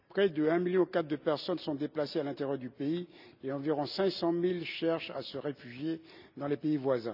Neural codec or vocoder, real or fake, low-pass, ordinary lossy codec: none; real; 5.4 kHz; none